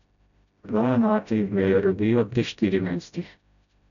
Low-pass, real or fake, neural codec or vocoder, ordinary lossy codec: 7.2 kHz; fake; codec, 16 kHz, 0.5 kbps, FreqCodec, smaller model; none